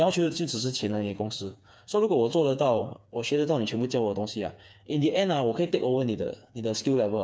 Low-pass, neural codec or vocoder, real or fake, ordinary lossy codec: none; codec, 16 kHz, 4 kbps, FreqCodec, smaller model; fake; none